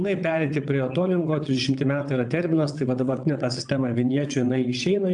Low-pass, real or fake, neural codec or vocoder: 9.9 kHz; fake; vocoder, 22.05 kHz, 80 mel bands, WaveNeXt